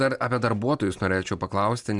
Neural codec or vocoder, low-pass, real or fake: none; 10.8 kHz; real